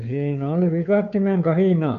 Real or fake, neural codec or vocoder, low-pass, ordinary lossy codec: fake; codec, 16 kHz, 2 kbps, FunCodec, trained on Chinese and English, 25 frames a second; 7.2 kHz; none